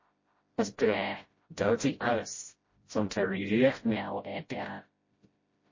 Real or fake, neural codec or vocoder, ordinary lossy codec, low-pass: fake; codec, 16 kHz, 0.5 kbps, FreqCodec, smaller model; MP3, 32 kbps; 7.2 kHz